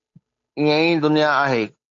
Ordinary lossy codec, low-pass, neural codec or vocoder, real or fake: AAC, 48 kbps; 7.2 kHz; codec, 16 kHz, 8 kbps, FunCodec, trained on Chinese and English, 25 frames a second; fake